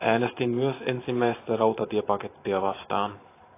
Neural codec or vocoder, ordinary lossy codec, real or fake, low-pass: vocoder, 44.1 kHz, 128 mel bands every 512 samples, BigVGAN v2; AAC, 24 kbps; fake; 3.6 kHz